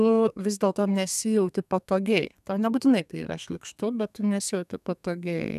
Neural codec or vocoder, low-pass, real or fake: codec, 32 kHz, 1.9 kbps, SNAC; 14.4 kHz; fake